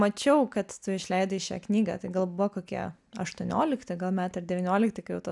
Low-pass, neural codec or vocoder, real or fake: 10.8 kHz; none; real